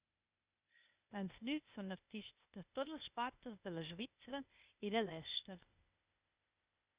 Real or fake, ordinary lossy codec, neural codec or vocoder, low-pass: fake; Opus, 64 kbps; codec, 16 kHz, 0.8 kbps, ZipCodec; 3.6 kHz